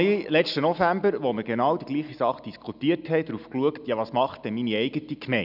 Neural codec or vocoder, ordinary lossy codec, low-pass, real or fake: none; none; 5.4 kHz; real